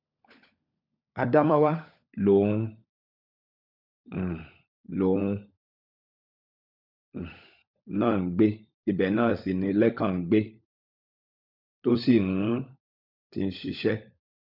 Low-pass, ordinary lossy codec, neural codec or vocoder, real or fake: 5.4 kHz; none; codec, 16 kHz, 16 kbps, FunCodec, trained on LibriTTS, 50 frames a second; fake